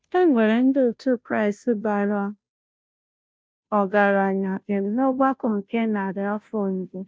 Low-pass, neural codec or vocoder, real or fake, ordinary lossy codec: none; codec, 16 kHz, 0.5 kbps, FunCodec, trained on Chinese and English, 25 frames a second; fake; none